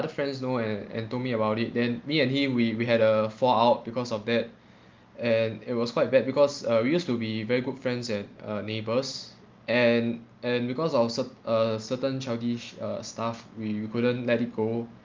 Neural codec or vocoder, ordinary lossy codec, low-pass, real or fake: none; Opus, 24 kbps; 7.2 kHz; real